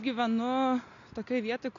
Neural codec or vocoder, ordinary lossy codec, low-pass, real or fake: none; AAC, 48 kbps; 7.2 kHz; real